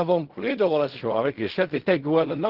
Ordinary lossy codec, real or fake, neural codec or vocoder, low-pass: Opus, 16 kbps; fake; codec, 16 kHz in and 24 kHz out, 0.4 kbps, LongCat-Audio-Codec, fine tuned four codebook decoder; 5.4 kHz